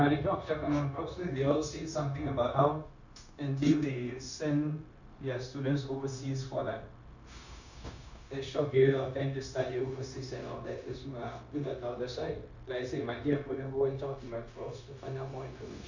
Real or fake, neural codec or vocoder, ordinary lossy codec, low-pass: fake; codec, 16 kHz, 0.9 kbps, LongCat-Audio-Codec; none; 7.2 kHz